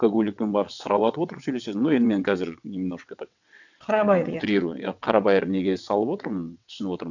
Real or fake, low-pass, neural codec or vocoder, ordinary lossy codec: fake; 7.2 kHz; vocoder, 22.05 kHz, 80 mel bands, WaveNeXt; none